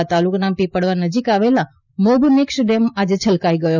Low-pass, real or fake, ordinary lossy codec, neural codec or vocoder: 7.2 kHz; real; none; none